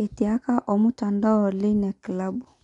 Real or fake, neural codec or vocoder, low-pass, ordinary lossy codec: real; none; 10.8 kHz; none